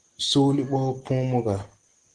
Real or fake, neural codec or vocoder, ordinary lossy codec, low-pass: real; none; Opus, 16 kbps; 9.9 kHz